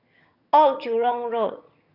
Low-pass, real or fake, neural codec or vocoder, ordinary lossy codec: 5.4 kHz; fake; vocoder, 22.05 kHz, 80 mel bands, HiFi-GAN; none